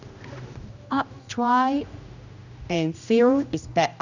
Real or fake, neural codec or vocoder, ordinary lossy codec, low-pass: fake; codec, 16 kHz, 1 kbps, X-Codec, HuBERT features, trained on general audio; none; 7.2 kHz